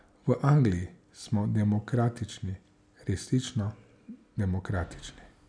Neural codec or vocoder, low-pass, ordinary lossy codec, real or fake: none; 9.9 kHz; none; real